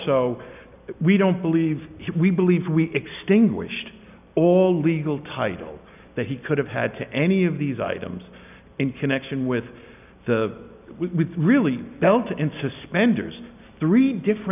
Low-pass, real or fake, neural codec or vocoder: 3.6 kHz; real; none